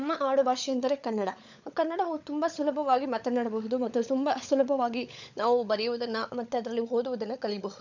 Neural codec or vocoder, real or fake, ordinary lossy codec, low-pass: codec, 16 kHz, 16 kbps, FunCodec, trained on LibriTTS, 50 frames a second; fake; none; 7.2 kHz